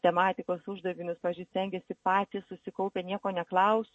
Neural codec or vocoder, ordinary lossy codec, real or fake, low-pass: none; MP3, 32 kbps; real; 10.8 kHz